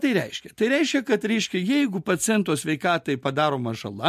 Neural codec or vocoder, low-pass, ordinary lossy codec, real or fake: none; 14.4 kHz; MP3, 64 kbps; real